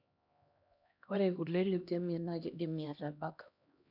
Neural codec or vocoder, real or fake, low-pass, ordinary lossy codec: codec, 16 kHz, 1 kbps, X-Codec, HuBERT features, trained on LibriSpeech; fake; 5.4 kHz; none